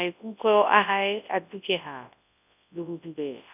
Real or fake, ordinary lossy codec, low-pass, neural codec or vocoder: fake; none; 3.6 kHz; codec, 24 kHz, 0.9 kbps, WavTokenizer, large speech release